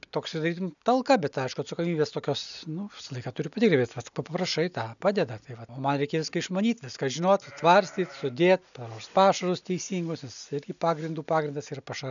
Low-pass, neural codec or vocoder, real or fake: 7.2 kHz; none; real